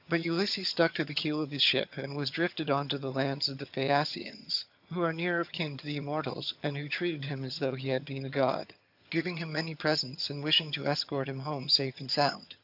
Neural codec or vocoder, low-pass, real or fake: vocoder, 22.05 kHz, 80 mel bands, HiFi-GAN; 5.4 kHz; fake